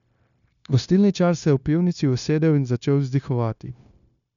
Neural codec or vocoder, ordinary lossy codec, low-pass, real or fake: codec, 16 kHz, 0.9 kbps, LongCat-Audio-Codec; none; 7.2 kHz; fake